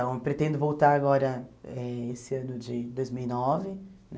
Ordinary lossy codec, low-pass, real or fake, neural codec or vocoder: none; none; real; none